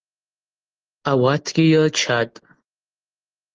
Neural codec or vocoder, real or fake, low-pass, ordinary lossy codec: none; real; 7.2 kHz; Opus, 16 kbps